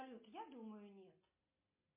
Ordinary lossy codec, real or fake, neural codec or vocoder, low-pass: MP3, 24 kbps; real; none; 3.6 kHz